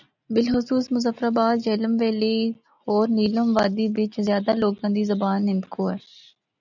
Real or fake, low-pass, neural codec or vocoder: real; 7.2 kHz; none